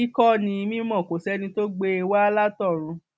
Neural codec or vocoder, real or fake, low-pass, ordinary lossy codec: none; real; none; none